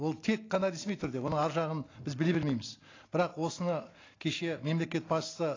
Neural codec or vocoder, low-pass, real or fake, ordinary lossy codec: none; 7.2 kHz; real; AAC, 32 kbps